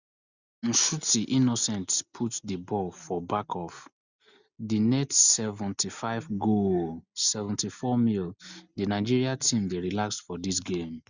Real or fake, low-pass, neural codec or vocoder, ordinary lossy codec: real; 7.2 kHz; none; Opus, 64 kbps